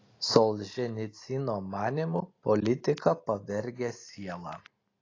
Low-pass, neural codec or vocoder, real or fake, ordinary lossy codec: 7.2 kHz; none; real; AAC, 32 kbps